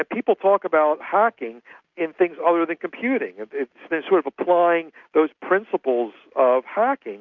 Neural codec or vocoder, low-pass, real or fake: none; 7.2 kHz; real